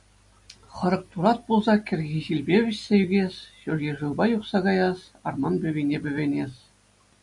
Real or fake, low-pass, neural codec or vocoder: real; 10.8 kHz; none